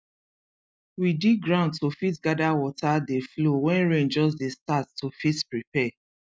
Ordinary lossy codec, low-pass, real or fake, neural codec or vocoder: none; none; real; none